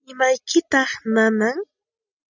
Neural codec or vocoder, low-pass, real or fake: none; 7.2 kHz; real